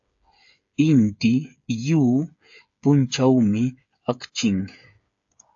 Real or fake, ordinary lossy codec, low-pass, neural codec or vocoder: fake; AAC, 64 kbps; 7.2 kHz; codec, 16 kHz, 8 kbps, FreqCodec, smaller model